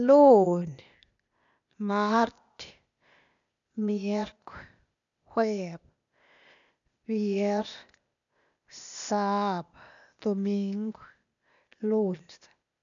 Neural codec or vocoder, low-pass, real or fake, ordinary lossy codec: codec, 16 kHz, 0.8 kbps, ZipCodec; 7.2 kHz; fake; none